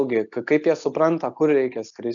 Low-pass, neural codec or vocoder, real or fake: 7.2 kHz; none; real